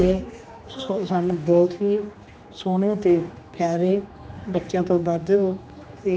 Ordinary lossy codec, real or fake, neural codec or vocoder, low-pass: none; fake; codec, 16 kHz, 2 kbps, X-Codec, HuBERT features, trained on general audio; none